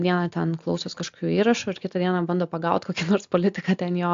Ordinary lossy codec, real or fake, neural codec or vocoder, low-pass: AAC, 64 kbps; real; none; 7.2 kHz